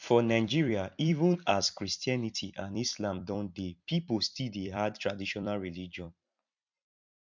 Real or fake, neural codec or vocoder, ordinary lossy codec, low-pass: fake; vocoder, 44.1 kHz, 128 mel bands every 256 samples, BigVGAN v2; MP3, 64 kbps; 7.2 kHz